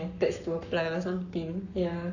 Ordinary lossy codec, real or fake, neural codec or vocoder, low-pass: none; fake; codec, 44.1 kHz, 7.8 kbps, Pupu-Codec; 7.2 kHz